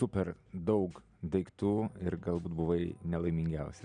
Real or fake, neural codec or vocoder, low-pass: real; none; 9.9 kHz